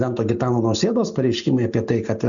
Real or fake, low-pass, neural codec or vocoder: real; 7.2 kHz; none